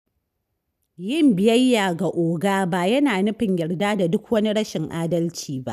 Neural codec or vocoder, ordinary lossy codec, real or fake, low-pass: none; none; real; 14.4 kHz